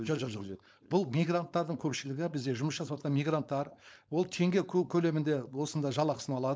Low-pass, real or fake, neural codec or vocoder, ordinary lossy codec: none; fake; codec, 16 kHz, 4.8 kbps, FACodec; none